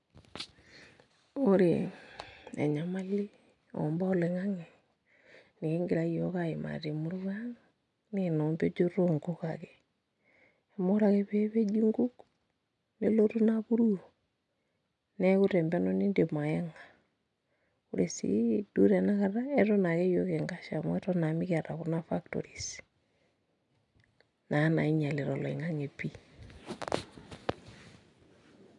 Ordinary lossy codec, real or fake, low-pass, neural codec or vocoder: none; real; 10.8 kHz; none